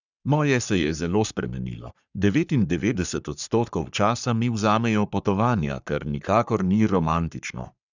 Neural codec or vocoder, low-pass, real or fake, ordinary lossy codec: codec, 44.1 kHz, 3.4 kbps, Pupu-Codec; 7.2 kHz; fake; none